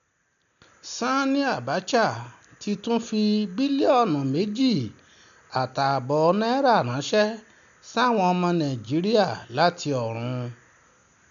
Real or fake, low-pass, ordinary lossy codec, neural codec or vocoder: real; 7.2 kHz; none; none